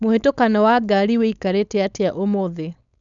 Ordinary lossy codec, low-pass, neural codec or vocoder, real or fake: none; 7.2 kHz; codec, 16 kHz, 4.8 kbps, FACodec; fake